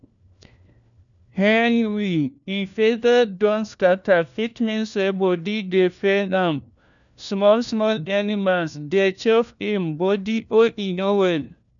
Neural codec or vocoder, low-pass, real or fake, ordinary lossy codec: codec, 16 kHz, 1 kbps, FunCodec, trained on LibriTTS, 50 frames a second; 7.2 kHz; fake; none